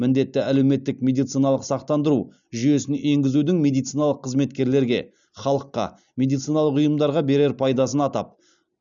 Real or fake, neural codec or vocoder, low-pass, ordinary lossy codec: real; none; 7.2 kHz; none